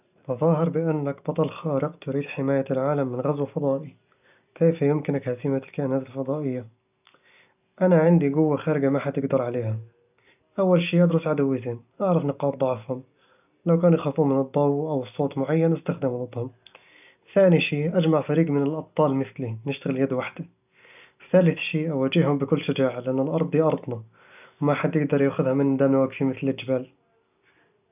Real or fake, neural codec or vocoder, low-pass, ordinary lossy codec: real; none; 3.6 kHz; none